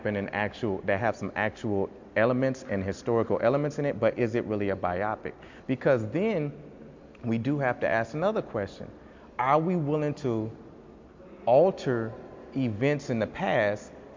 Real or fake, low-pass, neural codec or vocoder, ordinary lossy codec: real; 7.2 kHz; none; MP3, 64 kbps